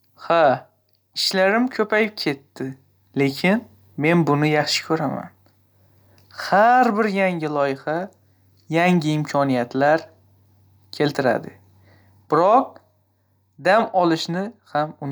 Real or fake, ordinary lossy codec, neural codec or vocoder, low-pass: real; none; none; none